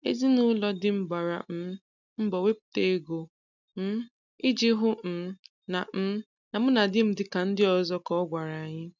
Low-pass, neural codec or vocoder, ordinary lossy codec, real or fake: 7.2 kHz; none; none; real